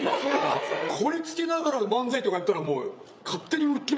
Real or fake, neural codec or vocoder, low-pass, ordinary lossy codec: fake; codec, 16 kHz, 8 kbps, FreqCodec, larger model; none; none